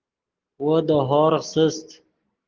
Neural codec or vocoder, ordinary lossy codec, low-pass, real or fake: none; Opus, 16 kbps; 7.2 kHz; real